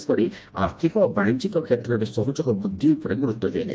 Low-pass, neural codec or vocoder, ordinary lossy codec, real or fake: none; codec, 16 kHz, 1 kbps, FreqCodec, smaller model; none; fake